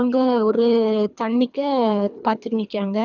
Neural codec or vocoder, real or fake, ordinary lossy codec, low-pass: codec, 24 kHz, 3 kbps, HILCodec; fake; none; 7.2 kHz